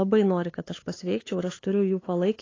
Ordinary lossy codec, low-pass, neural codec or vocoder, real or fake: AAC, 32 kbps; 7.2 kHz; codec, 16 kHz, 8 kbps, FunCodec, trained on LibriTTS, 25 frames a second; fake